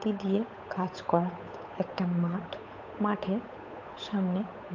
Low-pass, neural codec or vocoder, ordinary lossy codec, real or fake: 7.2 kHz; codec, 16 kHz, 8 kbps, FunCodec, trained on Chinese and English, 25 frames a second; MP3, 48 kbps; fake